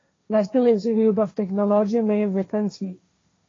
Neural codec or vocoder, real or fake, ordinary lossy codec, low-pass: codec, 16 kHz, 1.1 kbps, Voila-Tokenizer; fake; AAC, 32 kbps; 7.2 kHz